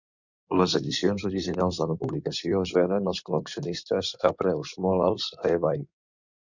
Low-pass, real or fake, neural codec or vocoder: 7.2 kHz; fake; codec, 16 kHz in and 24 kHz out, 2.2 kbps, FireRedTTS-2 codec